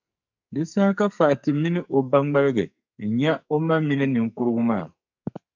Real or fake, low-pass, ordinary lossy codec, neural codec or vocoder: fake; 7.2 kHz; MP3, 64 kbps; codec, 44.1 kHz, 2.6 kbps, SNAC